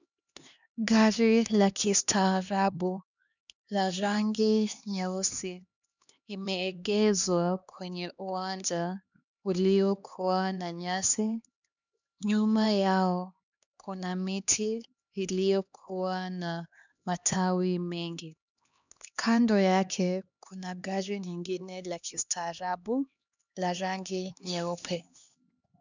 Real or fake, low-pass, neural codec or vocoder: fake; 7.2 kHz; codec, 16 kHz, 2 kbps, X-Codec, HuBERT features, trained on LibriSpeech